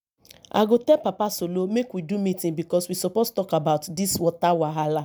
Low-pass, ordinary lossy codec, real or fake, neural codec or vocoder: none; none; real; none